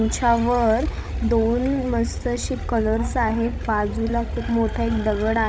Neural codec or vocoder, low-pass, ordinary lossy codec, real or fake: codec, 16 kHz, 16 kbps, FreqCodec, larger model; none; none; fake